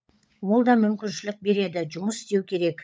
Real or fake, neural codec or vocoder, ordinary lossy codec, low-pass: fake; codec, 16 kHz, 16 kbps, FunCodec, trained on LibriTTS, 50 frames a second; none; none